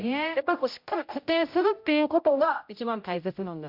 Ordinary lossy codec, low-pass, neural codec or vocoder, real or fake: MP3, 48 kbps; 5.4 kHz; codec, 16 kHz, 0.5 kbps, X-Codec, HuBERT features, trained on general audio; fake